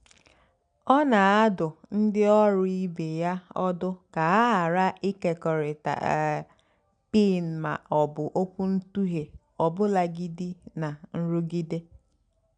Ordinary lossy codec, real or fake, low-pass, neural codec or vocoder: none; real; 9.9 kHz; none